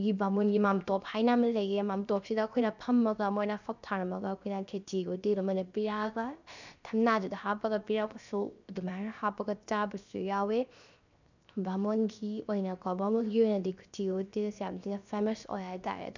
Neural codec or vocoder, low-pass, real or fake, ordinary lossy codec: codec, 16 kHz, 0.7 kbps, FocalCodec; 7.2 kHz; fake; none